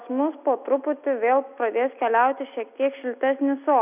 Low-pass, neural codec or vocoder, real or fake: 3.6 kHz; none; real